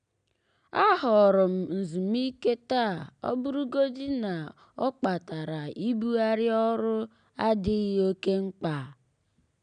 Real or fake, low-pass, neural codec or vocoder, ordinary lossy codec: real; 9.9 kHz; none; none